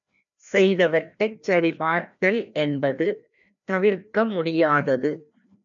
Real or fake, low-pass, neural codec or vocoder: fake; 7.2 kHz; codec, 16 kHz, 1 kbps, FreqCodec, larger model